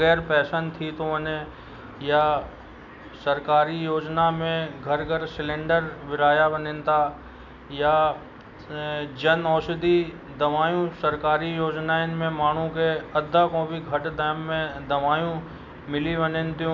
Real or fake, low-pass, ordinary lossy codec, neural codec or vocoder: real; 7.2 kHz; none; none